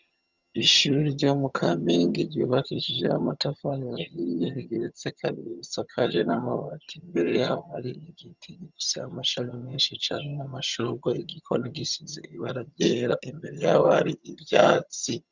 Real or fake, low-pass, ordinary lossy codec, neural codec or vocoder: fake; 7.2 kHz; Opus, 64 kbps; vocoder, 22.05 kHz, 80 mel bands, HiFi-GAN